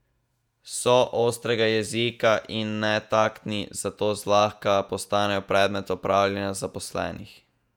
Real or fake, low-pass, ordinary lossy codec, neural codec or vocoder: fake; 19.8 kHz; none; vocoder, 48 kHz, 128 mel bands, Vocos